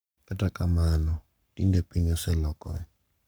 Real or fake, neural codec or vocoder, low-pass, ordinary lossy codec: fake; codec, 44.1 kHz, 7.8 kbps, Pupu-Codec; none; none